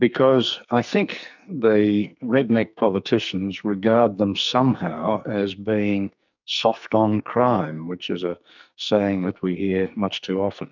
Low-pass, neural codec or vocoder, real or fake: 7.2 kHz; codec, 44.1 kHz, 2.6 kbps, SNAC; fake